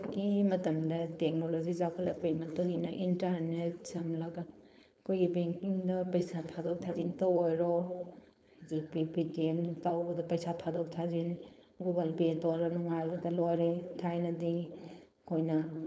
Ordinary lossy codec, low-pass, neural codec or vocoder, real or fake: none; none; codec, 16 kHz, 4.8 kbps, FACodec; fake